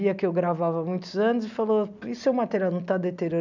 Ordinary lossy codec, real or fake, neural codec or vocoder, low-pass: none; real; none; 7.2 kHz